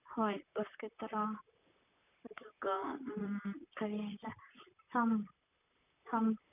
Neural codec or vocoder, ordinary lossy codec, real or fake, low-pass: vocoder, 44.1 kHz, 128 mel bands, Pupu-Vocoder; none; fake; 3.6 kHz